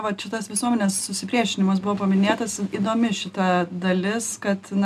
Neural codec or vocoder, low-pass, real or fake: none; 14.4 kHz; real